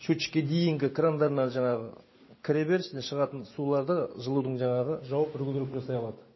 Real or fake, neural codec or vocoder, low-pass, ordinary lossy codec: real; none; 7.2 kHz; MP3, 24 kbps